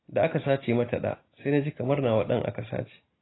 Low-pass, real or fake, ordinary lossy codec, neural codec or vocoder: 7.2 kHz; real; AAC, 16 kbps; none